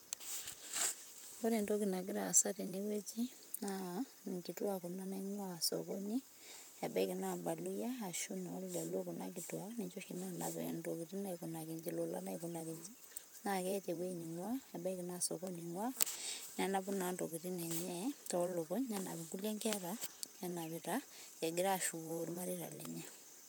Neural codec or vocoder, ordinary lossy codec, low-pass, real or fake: vocoder, 44.1 kHz, 128 mel bands, Pupu-Vocoder; none; none; fake